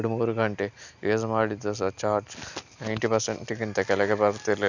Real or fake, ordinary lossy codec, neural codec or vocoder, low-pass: real; none; none; 7.2 kHz